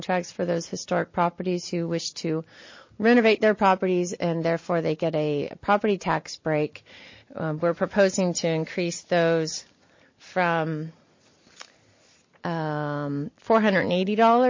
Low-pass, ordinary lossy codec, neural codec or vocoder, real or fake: 7.2 kHz; MP3, 32 kbps; none; real